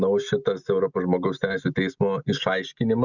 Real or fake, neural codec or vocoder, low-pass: real; none; 7.2 kHz